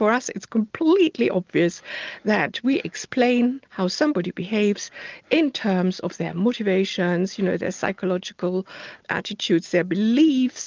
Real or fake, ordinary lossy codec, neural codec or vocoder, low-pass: real; Opus, 24 kbps; none; 7.2 kHz